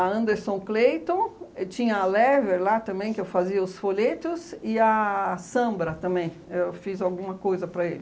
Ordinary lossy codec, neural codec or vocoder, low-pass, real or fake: none; none; none; real